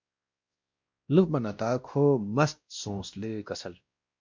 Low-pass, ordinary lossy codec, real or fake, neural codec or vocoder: 7.2 kHz; MP3, 48 kbps; fake; codec, 16 kHz, 1 kbps, X-Codec, WavLM features, trained on Multilingual LibriSpeech